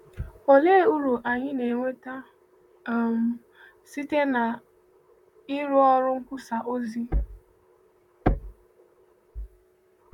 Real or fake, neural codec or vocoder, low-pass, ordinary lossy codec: fake; vocoder, 44.1 kHz, 128 mel bands, Pupu-Vocoder; 19.8 kHz; none